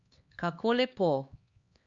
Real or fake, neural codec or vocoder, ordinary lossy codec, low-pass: fake; codec, 16 kHz, 2 kbps, X-Codec, HuBERT features, trained on LibriSpeech; Opus, 64 kbps; 7.2 kHz